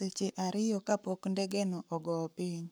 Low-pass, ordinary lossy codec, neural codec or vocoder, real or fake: none; none; codec, 44.1 kHz, 7.8 kbps, Pupu-Codec; fake